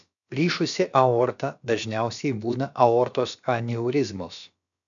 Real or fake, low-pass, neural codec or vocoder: fake; 7.2 kHz; codec, 16 kHz, about 1 kbps, DyCAST, with the encoder's durations